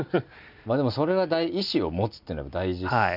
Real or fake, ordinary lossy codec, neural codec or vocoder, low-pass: real; none; none; 5.4 kHz